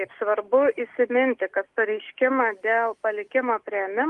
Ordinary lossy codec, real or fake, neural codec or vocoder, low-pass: Opus, 24 kbps; real; none; 10.8 kHz